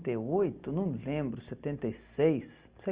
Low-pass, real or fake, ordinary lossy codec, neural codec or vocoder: 3.6 kHz; real; Opus, 24 kbps; none